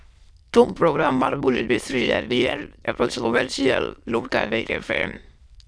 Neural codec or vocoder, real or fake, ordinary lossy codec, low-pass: autoencoder, 22.05 kHz, a latent of 192 numbers a frame, VITS, trained on many speakers; fake; none; none